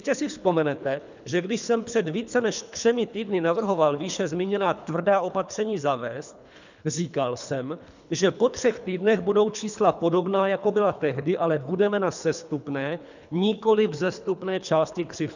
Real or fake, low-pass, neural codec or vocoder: fake; 7.2 kHz; codec, 24 kHz, 3 kbps, HILCodec